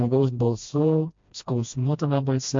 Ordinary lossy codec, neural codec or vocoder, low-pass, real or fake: MP3, 48 kbps; codec, 16 kHz, 1 kbps, FreqCodec, smaller model; 7.2 kHz; fake